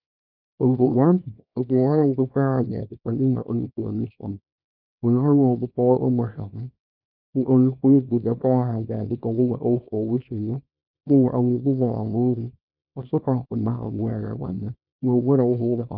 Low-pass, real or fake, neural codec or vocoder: 5.4 kHz; fake; codec, 24 kHz, 0.9 kbps, WavTokenizer, small release